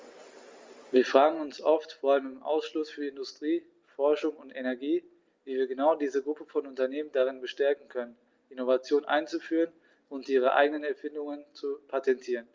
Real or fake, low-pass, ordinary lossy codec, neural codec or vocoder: real; 7.2 kHz; Opus, 32 kbps; none